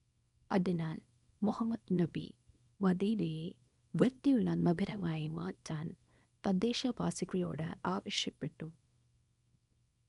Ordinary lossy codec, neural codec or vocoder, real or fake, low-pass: none; codec, 24 kHz, 0.9 kbps, WavTokenizer, small release; fake; 10.8 kHz